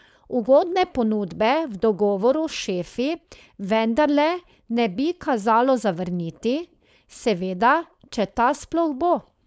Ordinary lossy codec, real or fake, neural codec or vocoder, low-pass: none; fake; codec, 16 kHz, 4.8 kbps, FACodec; none